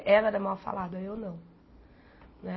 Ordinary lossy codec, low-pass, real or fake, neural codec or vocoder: MP3, 24 kbps; 7.2 kHz; real; none